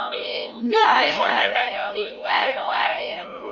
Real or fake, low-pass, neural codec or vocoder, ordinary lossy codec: fake; 7.2 kHz; codec, 16 kHz, 0.5 kbps, FreqCodec, larger model; none